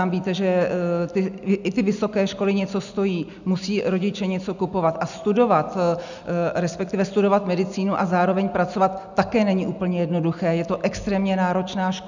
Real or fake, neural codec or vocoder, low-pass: real; none; 7.2 kHz